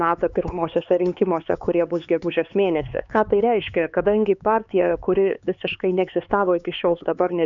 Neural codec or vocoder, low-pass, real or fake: codec, 16 kHz, 4 kbps, X-Codec, HuBERT features, trained on LibriSpeech; 7.2 kHz; fake